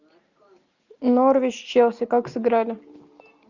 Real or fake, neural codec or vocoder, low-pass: real; none; 7.2 kHz